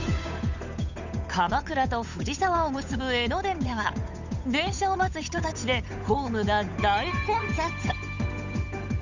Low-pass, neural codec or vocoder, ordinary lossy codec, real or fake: 7.2 kHz; codec, 16 kHz, 8 kbps, FunCodec, trained on Chinese and English, 25 frames a second; none; fake